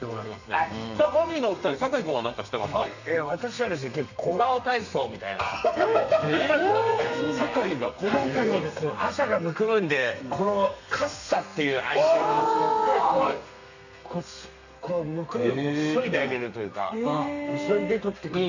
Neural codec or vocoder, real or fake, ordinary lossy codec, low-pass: codec, 32 kHz, 1.9 kbps, SNAC; fake; none; 7.2 kHz